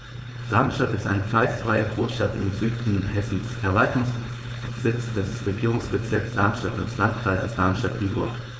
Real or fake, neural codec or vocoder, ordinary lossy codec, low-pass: fake; codec, 16 kHz, 4.8 kbps, FACodec; none; none